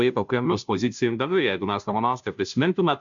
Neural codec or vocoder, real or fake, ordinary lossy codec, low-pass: codec, 16 kHz, 0.5 kbps, FunCodec, trained on Chinese and English, 25 frames a second; fake; MP3, 64 kbps; 7.2 kHz